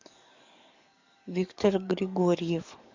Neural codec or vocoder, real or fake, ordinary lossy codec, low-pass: codec, 44.1 kHz, 7.8 kbps, DAC; fake; MP3, 64 kbps; 7.2 kHz